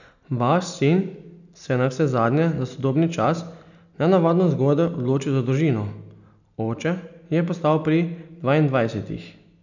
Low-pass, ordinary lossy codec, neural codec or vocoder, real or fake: 7.2 kHz; none; none; real